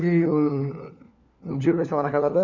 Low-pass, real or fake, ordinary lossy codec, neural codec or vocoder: 7.2 kHz; fake; none; codec, 16 kHz, 4 kbps, FunCodec, trained on LibriTTS, 50 frames a second